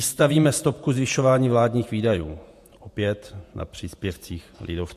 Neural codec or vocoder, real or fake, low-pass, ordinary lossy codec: vocoder, 48 kHz, 128 mel bands, Vocos; fake; 14.4 kHz; MP3, 64 kbps